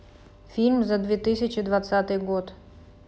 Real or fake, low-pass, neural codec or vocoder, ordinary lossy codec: real; none; none; none